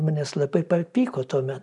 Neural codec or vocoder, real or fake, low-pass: none; real; 10.8 kHz